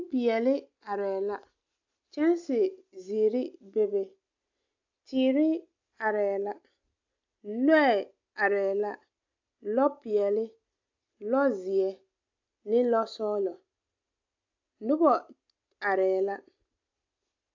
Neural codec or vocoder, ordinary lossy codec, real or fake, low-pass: none; AAC, 48 kbps; real; 7.2 kHz